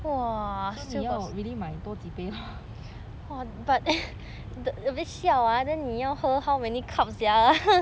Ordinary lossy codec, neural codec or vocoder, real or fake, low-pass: none; none; real; none